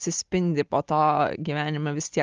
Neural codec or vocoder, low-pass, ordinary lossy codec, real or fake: none; 7.2 kHz; Opus, 24 kbps; real